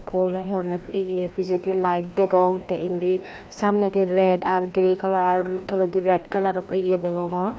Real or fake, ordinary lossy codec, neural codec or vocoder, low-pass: fake; none; codec, 16 kHz, 1 kbps, FreqCodec, larger model; none